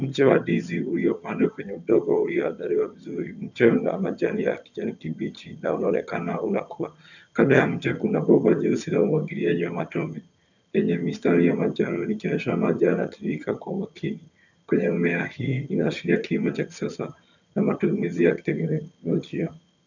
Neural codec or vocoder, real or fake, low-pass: vocoder, 22.05 kHz, 80 mel bands, HiFi-GAN; fake; 7.2 kHz